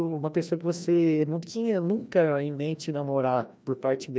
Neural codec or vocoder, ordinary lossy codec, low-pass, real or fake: codec, 16 kHz, 1 kbps, FreqCodec, larger model; none; none; fake